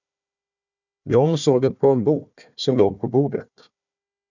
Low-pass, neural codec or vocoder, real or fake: 7.2 kHz; codec, 16 kHz, 1 kbps, FunCodec, trained on Chinese and English, 50 frames a second; fake